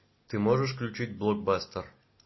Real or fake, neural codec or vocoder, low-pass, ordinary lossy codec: real; none; 7.2 kHz; MP3, 24 kbps